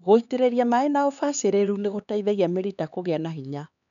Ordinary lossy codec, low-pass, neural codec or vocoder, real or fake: none; 7.2 kHz; codec, 16 kHz, 4 kbps, X-Codec, HuBERT features, trained on LibriSpeech; fake